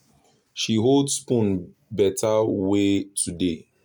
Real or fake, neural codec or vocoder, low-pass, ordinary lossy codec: real; none; none; none